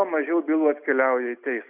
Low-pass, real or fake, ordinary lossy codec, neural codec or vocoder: 3.6 kHz; real; Opus, 64 kbps; none